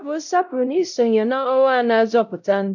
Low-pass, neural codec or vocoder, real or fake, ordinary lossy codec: 7.2 kHz; codec, 16 kHz, 0.5 kbps, X-Codec, WavLM features, trained on Multilingual LibriSpeech; fake; none